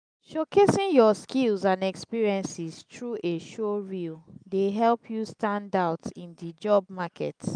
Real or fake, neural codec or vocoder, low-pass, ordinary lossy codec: real; none; 9.9 kHz; none